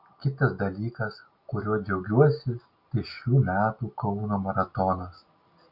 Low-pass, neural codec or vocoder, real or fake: 5.4 kHz; none; real